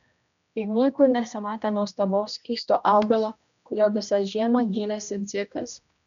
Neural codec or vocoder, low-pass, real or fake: codec, 16 kHz, 1 kbps, X-Codec, HuBERT features, trained on general audio; 7.2 kHz; fake